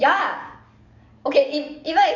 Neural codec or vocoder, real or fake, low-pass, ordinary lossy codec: codec, 44.1 kHz, 7.8 kbps, DAC; fake; 7.2 kHz; none